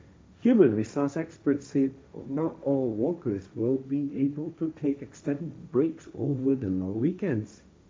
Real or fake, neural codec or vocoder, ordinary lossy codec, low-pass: fake; codec, 16 kHz, 1.1 kbps, Voila-Tokenizer; none; none